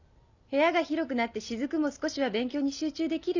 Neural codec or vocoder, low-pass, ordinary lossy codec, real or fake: none; 7.2 kHz; none; real